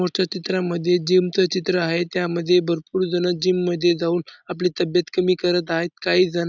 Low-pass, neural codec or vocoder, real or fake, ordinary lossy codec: 7.2 kHz; none; real; none